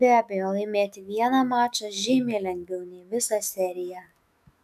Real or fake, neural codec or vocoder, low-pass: fake; autoencoder, 48 kHz, 128 numbers a frame, DAC-VAE, trained on Japanese speech; 14.4 kHz